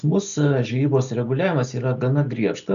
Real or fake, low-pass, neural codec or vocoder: real; 7.2 kHz; none